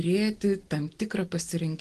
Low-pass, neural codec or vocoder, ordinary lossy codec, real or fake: 10.8 kHz; none; Opus, 16 kbps; real